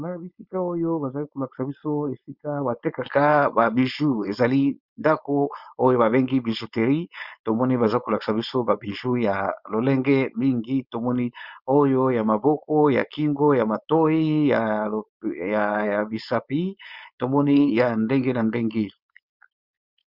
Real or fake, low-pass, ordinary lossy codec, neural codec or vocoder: fake; 5.4 kHz; Opus, 64 kbps; codec, 16 kHz, 4.8 kbps, FACodec